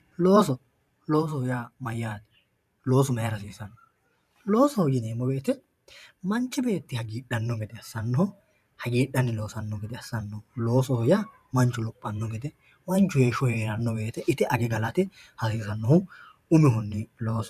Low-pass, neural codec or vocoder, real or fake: 14.4 kHz; vocoder, 44.1 kHz, 128 mel bands every 512 samples, BigVGAN v2; fake